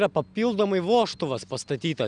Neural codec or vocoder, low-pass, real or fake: none; 9.9 kHz; real